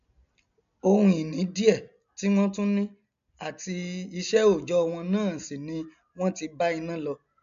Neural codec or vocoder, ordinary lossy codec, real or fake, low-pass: none; AAC, 96 kbps; real; 7.2 kHz